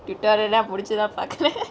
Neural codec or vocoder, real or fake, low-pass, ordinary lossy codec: none; real; none; none